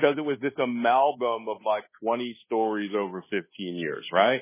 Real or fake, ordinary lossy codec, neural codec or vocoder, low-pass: fake; MP3, 16 kbps; codec, 24 kHz, 1.2 kbps, DualCodec; 3.6 kHz